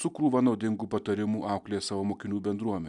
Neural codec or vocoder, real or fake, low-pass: none; real; 10.8 kHz